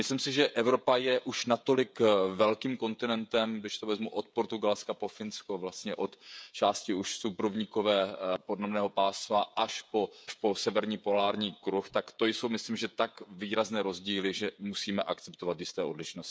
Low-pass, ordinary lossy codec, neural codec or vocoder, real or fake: none; none; codec, 16 kHz, 16 kbps, FreqCodec, smaller model; fake